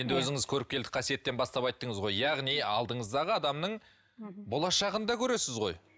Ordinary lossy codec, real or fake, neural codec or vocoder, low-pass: none; real; none; none